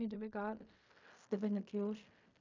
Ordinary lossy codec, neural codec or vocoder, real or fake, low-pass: MP3, 64 kbps; codec, 16 kHz in and 24 kHz out, 0.4 kbps, LongCat-Audio-Codec, fine tuned four codebook decoder; fake; 7.2 kHz